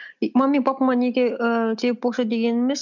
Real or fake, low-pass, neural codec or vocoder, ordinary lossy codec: real; 7.2 kHz; none; none